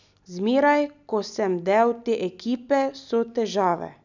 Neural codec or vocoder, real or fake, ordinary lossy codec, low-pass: none; real; none; 7.2 kHz